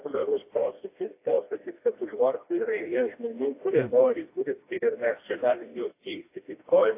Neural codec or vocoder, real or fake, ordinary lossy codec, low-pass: codec, 16 kHz, 1 kbps, FreqCodec, smaller model; fake; AAC, 24 kbps; 3.6 kHz